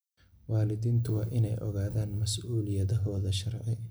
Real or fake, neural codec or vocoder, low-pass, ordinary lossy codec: real; none; none; none